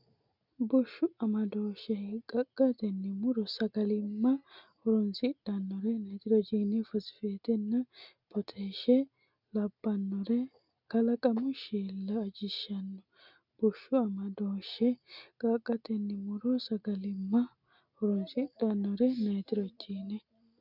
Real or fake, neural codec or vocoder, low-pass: real; none; 5.4 kHz